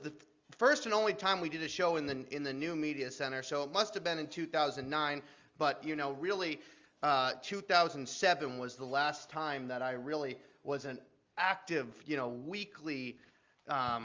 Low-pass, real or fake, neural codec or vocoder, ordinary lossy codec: 7.2 kHz; real; none; Opus, 32 kbps